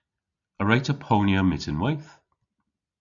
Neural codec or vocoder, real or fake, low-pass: none; real; 7.2 kHz